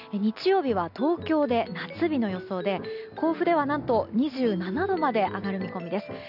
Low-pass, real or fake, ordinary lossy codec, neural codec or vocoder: 5.4 kHz; real; none; none